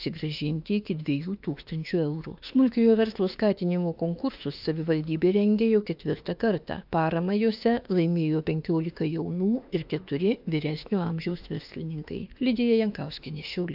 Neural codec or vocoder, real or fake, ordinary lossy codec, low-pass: autoencoder, 48 kHz, 32 numbers a frame, DAC-VAE, trained on Japanese speech; fake; AAC, 48 kbps; 5.4 kHz